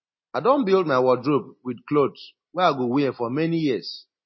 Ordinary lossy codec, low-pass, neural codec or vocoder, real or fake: MP3, 24 kbps; 7.2 kHz; none; real